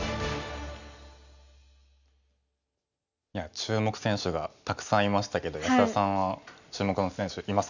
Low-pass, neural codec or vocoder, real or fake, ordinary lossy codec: 7.2 kHz; none; real; none